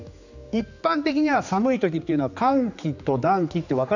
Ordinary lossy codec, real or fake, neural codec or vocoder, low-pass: none; fake; codec, 16 kHz, 4 kbps, X-Codec, HuBERT features, trained on general audio; 7.2 kHz